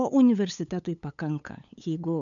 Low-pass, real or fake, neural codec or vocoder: 7.2 kHz; fake; codec, 16 kHz, 8 kbps, FunCodec, trained on LibriTTS, 25 frames a second